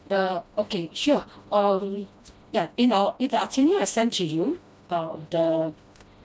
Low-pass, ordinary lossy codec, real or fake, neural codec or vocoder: none; none; fake; codec, 16 kHz, 1 kbps, FreqCodec, smaller model